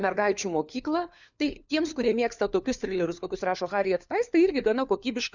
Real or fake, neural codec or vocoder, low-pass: fake; codec, 16 kHz, 4 kbps, FunCodec, trained on LibriTTS, 50 frames a second; 7.2 kHz